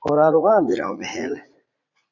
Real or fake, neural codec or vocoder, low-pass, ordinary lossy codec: fake; vocoder, 44.1 kHz, 80 mel bands, Vocos; 7.2 kHz; AAC, 48 kbps